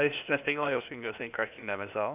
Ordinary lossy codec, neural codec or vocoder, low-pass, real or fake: none; codec, 16 kHz, 0.8 kbps, ZipCodec; 3.6 kHz; fake